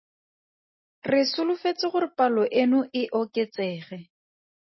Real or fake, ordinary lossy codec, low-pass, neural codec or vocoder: real; MP3, 24 kbps; 7.2 kHz; none